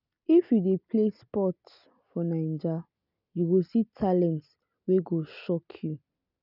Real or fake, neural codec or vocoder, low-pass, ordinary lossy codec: real; none; 5.4 kHz; none